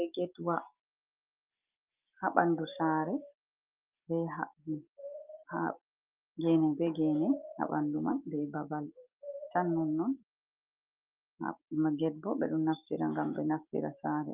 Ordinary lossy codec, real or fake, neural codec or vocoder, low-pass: Opus, 24 kbps; real; none; 3.6 kHz